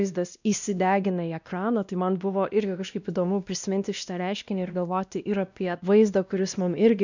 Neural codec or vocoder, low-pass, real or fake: codec, 16 kHz, 1 kbps, X-Codec, WavLM features, trained on Multilingual LibriSpeech; 7.2 kHz; fake